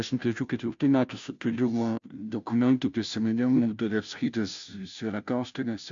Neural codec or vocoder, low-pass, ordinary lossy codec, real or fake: codec, 16 kHz, 0.5 kbps, FunCodec, trained on Chinese and English, 25 frames a second; 7.2 kHz; MP3, 64 kbps; fake